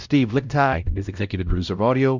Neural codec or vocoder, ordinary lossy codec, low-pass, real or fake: codec, 16 kHz, 0.5 kbps, X-Codec, WavLM features, trained on Multilingual LibriSpeech; Opus, 64 kbps; 7.2 kHz; fake